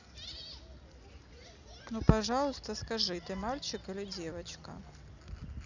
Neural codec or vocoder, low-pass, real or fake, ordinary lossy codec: none; 7.2 kHz; real; none